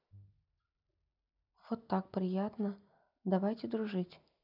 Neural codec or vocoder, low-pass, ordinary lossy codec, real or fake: none; 5.4 kHz; none; real